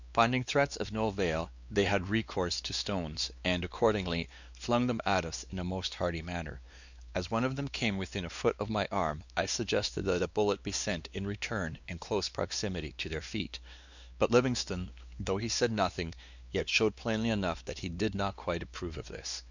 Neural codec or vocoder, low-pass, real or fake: codec, 16 kHz, 2 kbps, X-Codec, WavLM features, trained on Multilingual LibriSpeech; 7.2 kHz; fake